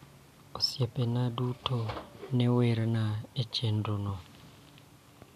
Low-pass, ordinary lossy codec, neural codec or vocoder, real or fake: 14.4 kHz; none; none; real